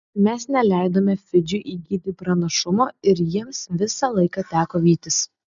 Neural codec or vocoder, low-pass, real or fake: none; 7.2 kHz; real